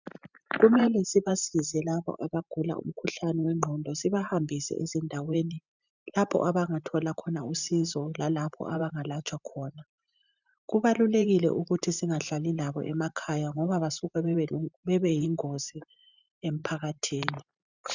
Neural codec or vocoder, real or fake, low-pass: vocoder, 44.1 kHz, 128 mel bands every 512 samples, BigVGAN v2; fake; 7.2 kHz